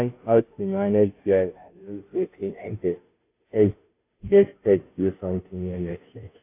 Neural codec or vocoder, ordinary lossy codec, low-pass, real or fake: codec, 16 kHz, 0.5 kbps, FunCodec, trained on Chinese and English, 25 frames a second; AAC, 24 kbps; 3.6 kHz; fake